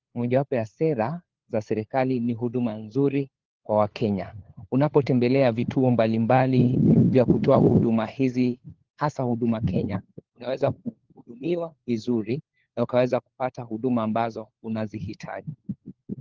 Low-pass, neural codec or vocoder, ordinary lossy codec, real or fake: 7.2 kHz; codec, 16 kHz, 4 kbps, FunCodec, trained on LibriTTS, 50 frames a second; Opus, 16 kbps; fake